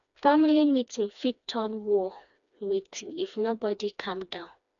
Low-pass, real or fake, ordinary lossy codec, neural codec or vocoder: 7.2 kHz; fake; none; codec, 16 kHz, 2 kbps, FreqCodec, smaller model